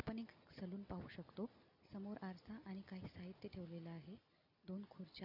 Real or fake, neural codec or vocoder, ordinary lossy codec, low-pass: real; none; none; 5.4 kHz